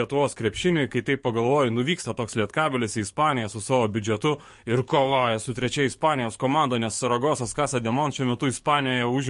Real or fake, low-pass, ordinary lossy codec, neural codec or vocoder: fake; 14.4 kHz; MP3, 48 kbps; codec, 44.1 kHz, 7.8 kbps, DAC